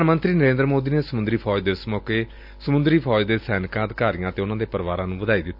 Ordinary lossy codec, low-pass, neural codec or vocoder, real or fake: AAC, 48 kbps; 5.4 kHz; none; real